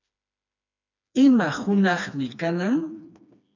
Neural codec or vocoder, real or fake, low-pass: codec, 16 kHz, 2 kbps, FreqCodec, smaller model; fake; 7.2 kHz